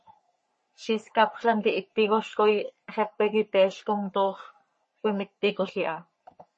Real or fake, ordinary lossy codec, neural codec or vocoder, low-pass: fake; MP3, 32 kbps; codec, 44.1 kHz, 3.4 kbps, Pupu-Codec; 10.8 kHz